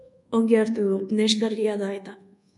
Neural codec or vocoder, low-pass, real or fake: codec, 24 kHz, 1.2 kbps, DualCodec; 10.8 kHz; fake